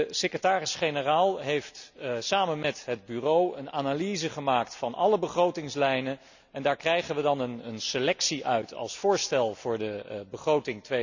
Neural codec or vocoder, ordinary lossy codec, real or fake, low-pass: none; none; real; 7.2 kHz